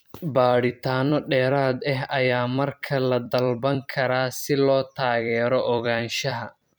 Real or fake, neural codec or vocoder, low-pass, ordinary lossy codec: fake; vocoder, 44.1 kHz, 128 mel bands every 256 samples, BigVGAN v2; none; none